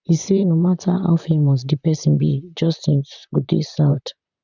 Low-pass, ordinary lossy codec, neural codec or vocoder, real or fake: 7.2 kHz; none; vocoder, 44.1 kHz, 128 mel bands, Pupu-Vocoder; fake